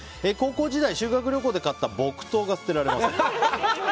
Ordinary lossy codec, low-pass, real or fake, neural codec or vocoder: none; none; real; none